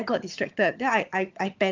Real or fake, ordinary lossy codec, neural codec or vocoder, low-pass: fake; Opus, 32 kbps; vocoder, 22.05 kHz, 80 mel bands, HiFi-GAN; 7.2 kHz